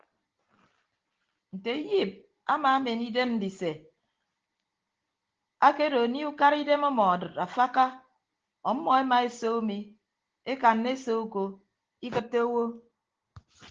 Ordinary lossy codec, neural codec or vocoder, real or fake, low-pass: Opus, 16 kbps; none; real; 7.2 kHz